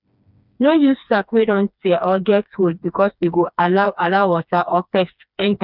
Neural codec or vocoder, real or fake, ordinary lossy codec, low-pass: codec, 16 kHz, 2 kbps, FreqCodec, smaller model; fake; none; 5.4 kHz